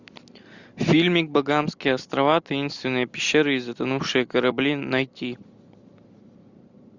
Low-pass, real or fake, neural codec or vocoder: 7.2 kHz; real; none